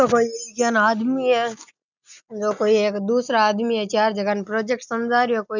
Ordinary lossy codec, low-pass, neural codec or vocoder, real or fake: none; 7.2 kHz; none; real